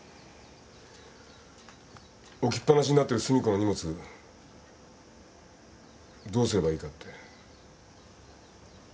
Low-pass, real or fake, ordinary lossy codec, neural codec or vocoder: none; real; none; none